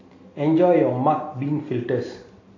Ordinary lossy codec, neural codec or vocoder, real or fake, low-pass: AAC, 32 kbps; none; real; 7.2 kHz